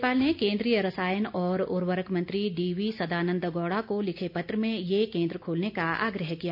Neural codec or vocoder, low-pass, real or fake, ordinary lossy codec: none; 5.4 kHz; real; none